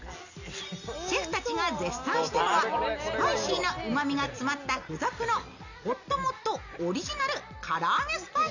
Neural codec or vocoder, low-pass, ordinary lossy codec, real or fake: none; 7.2 kHz; none; real